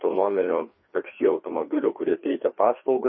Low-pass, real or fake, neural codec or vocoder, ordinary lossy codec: 7.2 kHz; fake; codec, 16 kHz, 2 kbps, FreqCodec, larger model; MP3, 24 kbps